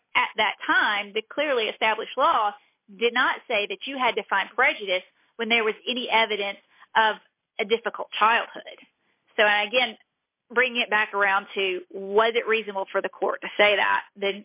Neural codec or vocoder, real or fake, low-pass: none; real; 3.6 kHz